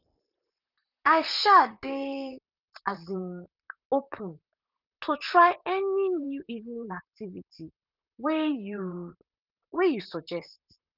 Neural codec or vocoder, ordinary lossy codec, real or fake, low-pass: vocoder, 44.1 kHz, 128 mel bands, Pupu-Vocoder; none; fake; 5.4 kHz